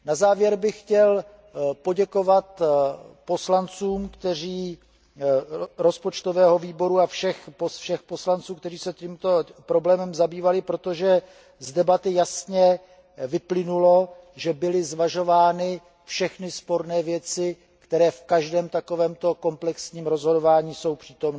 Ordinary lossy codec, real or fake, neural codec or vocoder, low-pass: none; real; none; none